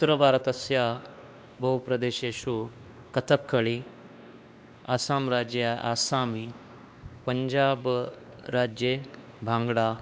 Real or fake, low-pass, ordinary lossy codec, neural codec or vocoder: fake; none; none; codec, 16 kHz, 2 kbps, X-Codec, WavLM features, trained on Multilingual LibriSpeech